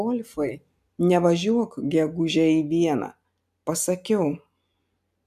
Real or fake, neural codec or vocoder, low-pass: real; none; 14.4 kHz